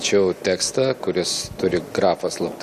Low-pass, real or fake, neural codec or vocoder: 14.4 kHz; real; none